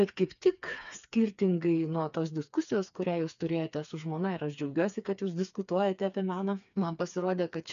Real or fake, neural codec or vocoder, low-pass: fake; codec, 16 kHz, 4 kbps, FreqCodec, smaller model; 7.2 kHz